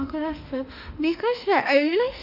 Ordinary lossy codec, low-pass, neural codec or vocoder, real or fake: none; 5.4 kHz; autoencoder, 48 kHz, 32 numbers a frame, DAC-VAE, trained on Japanese speech; fake